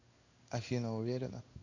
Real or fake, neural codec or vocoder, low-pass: fake; codec, 16 kHz in and 24 kHz out, 1 kbps, XY-Tokenizer; 7.2 kHz